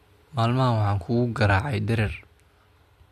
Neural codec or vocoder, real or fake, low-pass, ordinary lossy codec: none; real; 14.4 kHz; MP3, 64 kbps